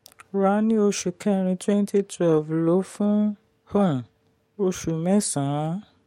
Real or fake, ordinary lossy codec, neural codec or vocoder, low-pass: fake; MP3, 64 kbps; codec, 44.1 kHz, 7.8 kbps, DAC; 19.8 kHz